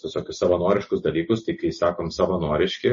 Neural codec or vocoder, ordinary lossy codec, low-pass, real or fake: none; MP3, 32 kbps; 7.2 kHz; real